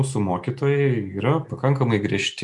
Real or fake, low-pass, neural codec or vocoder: real; 10.8 kHz; none